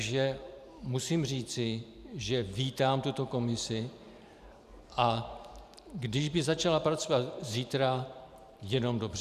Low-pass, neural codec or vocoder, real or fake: 14.4 kHz; vocoder, 44.1 kHz, 128 mel bands every 512 samples, BigVGAN v2; fake